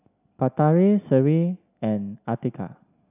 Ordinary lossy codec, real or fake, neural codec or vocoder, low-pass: none; real; none; 3.6 kHz